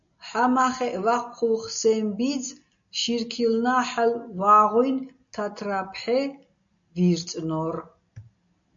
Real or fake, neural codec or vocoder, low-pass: real; none; 7.2 kHz